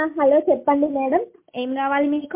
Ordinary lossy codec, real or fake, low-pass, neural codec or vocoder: MP3, 24 kbps; real; 3.6 kHz; none